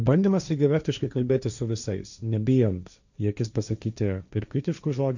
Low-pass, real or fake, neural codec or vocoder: 7.2 kHz; fake; codec, 16 kHz, 1.1 kbps, Voila-Tokenizer